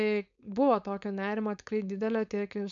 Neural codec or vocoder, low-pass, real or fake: codec, 16 kHz, 4.8 kbps, FACodec; 7.2 kHz; fake